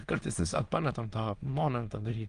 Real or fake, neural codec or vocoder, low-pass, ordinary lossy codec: fake; autoencoder, 22.05 kHz, a latent of 192 numbers a frame, VITS, trained on many speakers; 9.9 kHz; Opus, 24 kbps